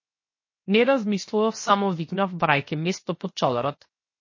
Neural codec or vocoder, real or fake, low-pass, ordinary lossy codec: codec, 16 kHz, 0.7 kbps, FocalCodec; fake; 7.2 kHz; MP3, 32 kbps